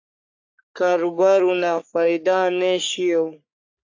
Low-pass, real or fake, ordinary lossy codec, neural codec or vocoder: 7.2 kHz; fake; AAC, 48 kbps; codec, 44.1 kHz, 3.4 kbps, Pupu-Codec